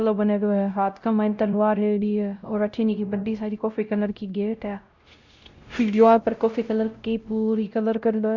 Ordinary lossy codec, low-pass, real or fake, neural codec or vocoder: Opus, 64 kbps; 7.2 kHz; fake; codec, 16 kHz, 0.5 kbps, X-Codec, WavLM features, trained on Multilingual LibriSpeech